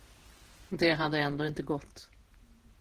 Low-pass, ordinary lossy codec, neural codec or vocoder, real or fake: 14.4 kHz; Opus, 16 kbps; vocoder, 48 kHz, 128 mel bands, Vocos; fake